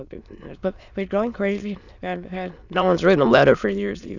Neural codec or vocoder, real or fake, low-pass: autoencoder, 22.05 kHz, a latent of 192 numbers a frame, VITS, trained on many speakers; fake; 7.2 kHz